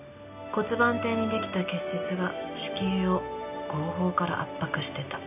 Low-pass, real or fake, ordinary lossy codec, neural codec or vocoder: 3.6 kHz; real; none; none